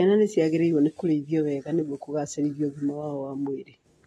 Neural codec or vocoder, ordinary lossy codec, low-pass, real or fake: none; AAC, 32 kbps; 19.8 kHz; real